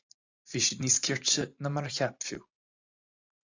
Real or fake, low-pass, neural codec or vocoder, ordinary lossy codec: fake; 7.2 kHz; vocoder, 44.1 kHz, 128 mel bands every 512 samples, BigVGAN v2; AAC, 48 kbps